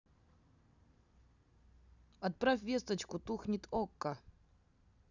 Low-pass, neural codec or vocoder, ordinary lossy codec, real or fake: 7.2 kHz; none; none; real